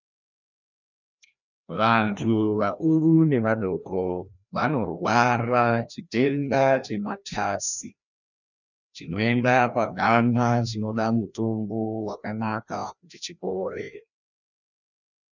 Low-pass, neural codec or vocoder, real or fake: 7.2 kHz; codec, 16 kHz, 1 kbps, FreqCodec, larger model; fake